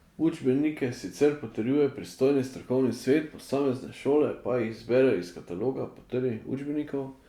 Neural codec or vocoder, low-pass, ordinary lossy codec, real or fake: none; 19.8 kHz; Opus, 64 kbps; real